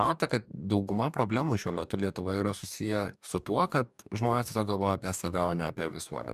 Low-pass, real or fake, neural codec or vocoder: 14.4 kHz; fake; codec, 44.1 kHz, 2.6 kbps, DAC